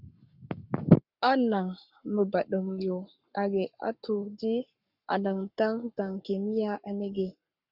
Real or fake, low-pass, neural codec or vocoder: fake; 5.4 kHz; codec, 44.1 kHz, 7.8 kbps, Pupu-Codec